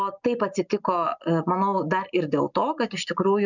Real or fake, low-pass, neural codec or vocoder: real; 7.2 kHz; none